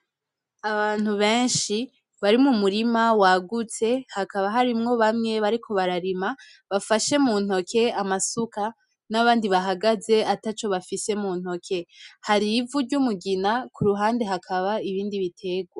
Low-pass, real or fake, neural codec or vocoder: 10.8 kHz; real; none